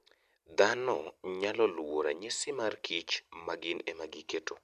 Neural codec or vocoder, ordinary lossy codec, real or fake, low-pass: vocoder, 44.1 kHz, 128 mel bands every 256 samples, BigVGAN v2; none; fake; 14.4 kHz